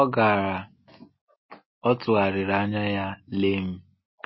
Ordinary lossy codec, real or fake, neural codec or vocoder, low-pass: MP3, 24 kbps; real; none; 7.2 kHz